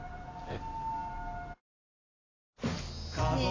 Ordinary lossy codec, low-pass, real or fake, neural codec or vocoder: AAC, 32 kbps; 7.2 kHz; real; none